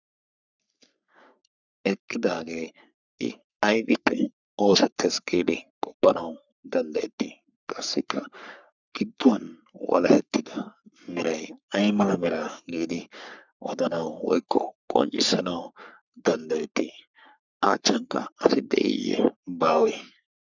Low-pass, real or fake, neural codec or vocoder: 7.2 kHz; fake; codec, 44.1 kHz, 3.4 kbps, Pupu-Codec